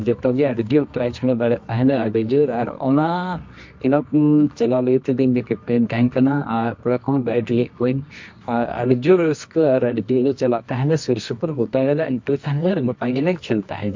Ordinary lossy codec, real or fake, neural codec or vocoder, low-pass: MP3, 48 kbps; fake; codec, 24 kHz, 0.9 kbps, WavTokenizer, medium music audio release; 7.2 kHz